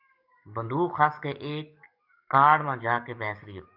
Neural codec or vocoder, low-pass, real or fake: codec, 44.1 kHz, 7.8 kbps, DAC; 5.4 kHz; fake